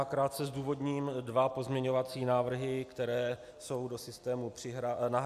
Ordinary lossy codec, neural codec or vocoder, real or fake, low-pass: AAC, 96 kbps; none; real; 14.4 kHz